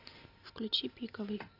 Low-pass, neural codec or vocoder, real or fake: 5.4 kHz; none; real